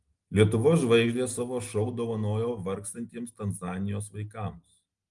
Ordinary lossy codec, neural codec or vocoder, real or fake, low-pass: Opus, 24 kbps; none; real; 10.8 kHz